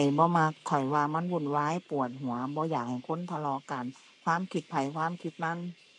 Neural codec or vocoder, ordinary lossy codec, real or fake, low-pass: codec, 44.1 kHz, 7.8 kbps, Pupu-Codec; none; fake; 10.8 kHz